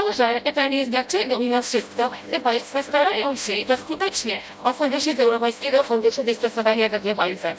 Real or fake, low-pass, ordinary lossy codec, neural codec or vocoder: fake; none; none; codec, 16 kHz, 0.5 kbps, FreqCodec, smaller model